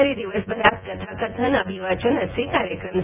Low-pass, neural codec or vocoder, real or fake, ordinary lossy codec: 3.6 kHz; vocoder, 24 kHz, 100 mel bands, Vocos; fake; none